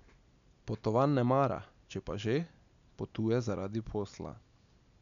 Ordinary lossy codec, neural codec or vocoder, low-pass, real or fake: none; none; 7.2 kHz; real